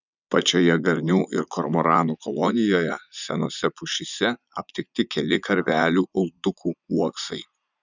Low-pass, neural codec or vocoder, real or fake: 7.2 kHz; vocoder, 44.1 kHz, 80 mel bands, Vocos; fake